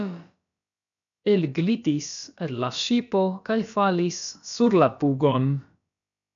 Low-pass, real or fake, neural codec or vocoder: 7.2 kHz; fake; codec, 16 kHz, about 1 kbps, DyCAST, with the encoder's durations